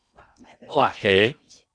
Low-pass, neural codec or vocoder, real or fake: 9.9 kHz; codec, 16 kHz in and 24 kHz out, 0.8 kbps, FocalCodec, streaming, 65536 codes; fake